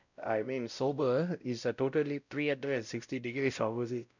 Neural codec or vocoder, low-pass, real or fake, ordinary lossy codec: codec, 16 kHz, 0.5 kbps, X-Codec, WavLM features, trained on Multilingual LibriSpeech; 7.2 kHz; fake; none